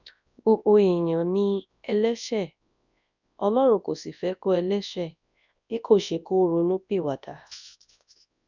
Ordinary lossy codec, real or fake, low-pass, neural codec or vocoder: none; fake; 7.2 kHz; codec, 24 kHz, 0.9 kbps, WavTokenizer, large speech release